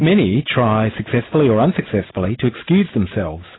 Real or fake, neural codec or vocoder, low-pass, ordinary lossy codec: real; none; 7.2 kHz; AAC, 16 kbps